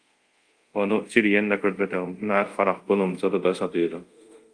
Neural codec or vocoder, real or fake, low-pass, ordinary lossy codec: codec, 24 kHz, 0.5 kbps, DualCodec; fake; 9.9 kHz; Opus, 32 kbps